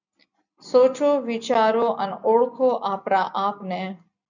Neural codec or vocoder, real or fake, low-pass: none; real; 7.2 kHz